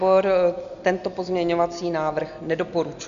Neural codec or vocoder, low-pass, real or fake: none; 7.2 kHz; real